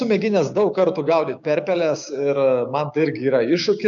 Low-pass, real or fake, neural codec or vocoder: 7.2 kHz; fake; codec, 16 kHz, 6 kbps, DAC